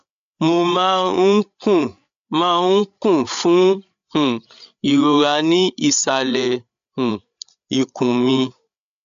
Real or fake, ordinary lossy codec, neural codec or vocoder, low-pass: fake; MP3, 64 kbps; codec, 16 kHz, 8 kbps, FreqCodec, larger model; 7.2 kHz